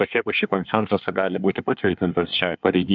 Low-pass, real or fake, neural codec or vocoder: 7.2 kHz; fake; codec, 24 kHz, 1 kbps, SNAC